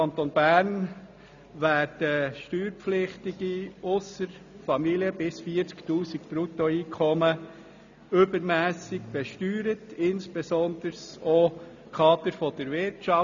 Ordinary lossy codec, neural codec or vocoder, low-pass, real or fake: none; none; 7.2 kHz; real